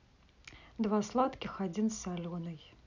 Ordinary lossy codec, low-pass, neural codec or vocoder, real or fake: none; 7.2 kHz; none; real